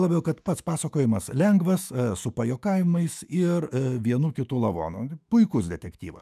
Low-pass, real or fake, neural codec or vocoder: 14.4 kHz; fake; autoencoder, 48 kHz, 128 numbers a frame, DAC-VAE, trained on Japanese speech